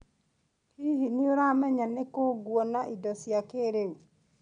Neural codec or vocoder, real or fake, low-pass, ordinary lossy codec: none; real; 9.9 kHz; none